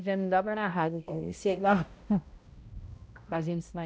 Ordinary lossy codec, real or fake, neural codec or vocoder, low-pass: none; fake; codec, 16 kHz, 0.5 kbps, X-Codec, HuBERT features, trained on balanced general audio; none